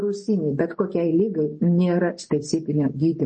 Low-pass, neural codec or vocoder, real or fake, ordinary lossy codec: 9.9 kHz; none; real; MP3, 32 kbps